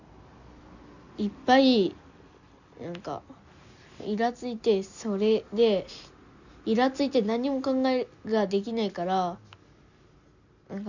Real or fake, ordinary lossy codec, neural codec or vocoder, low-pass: real; none; none; 7.2 kHz